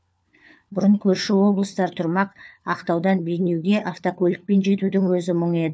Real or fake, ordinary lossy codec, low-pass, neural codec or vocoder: fake; none; none; codec, 16 kHz, 4 kbps, FunCodec, trained on LibriTTS, 50 frames a second